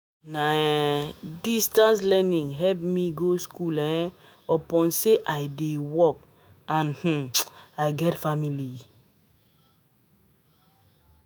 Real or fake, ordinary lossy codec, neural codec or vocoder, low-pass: fake; none; autoencoder, 48 kHz, 128 numbers a frame, DAC-VAE, trained on Japanese speech; none